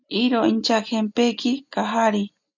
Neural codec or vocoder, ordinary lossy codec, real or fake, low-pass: none; MP3, 64 kbps; real; 7.2 kHz